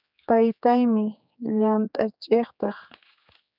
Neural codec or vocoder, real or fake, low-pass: codec, 16 kHz, 4 kbps, X-Codec, HuBERT features, trained on general audio; fake; 5.4 kHz